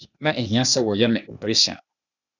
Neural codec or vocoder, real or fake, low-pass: codec, 16 kHz, 0.8 kbps, ZipCodec; fake; 7.2 kHz